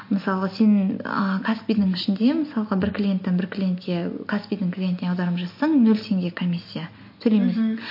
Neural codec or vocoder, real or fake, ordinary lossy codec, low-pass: none; real; MP3, 32 kbps; 5.4 kHz